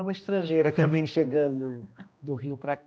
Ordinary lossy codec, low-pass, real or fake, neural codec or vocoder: none; none; fake; codec, 16 kHz, 1 kbps, X-Codec, HuBERT features, trained on general audio